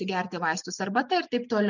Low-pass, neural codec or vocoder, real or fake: 7.2 kHz; none; real